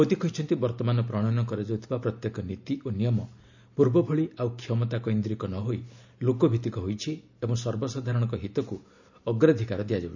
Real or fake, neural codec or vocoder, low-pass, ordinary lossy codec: real; none; 7.2 kHz; none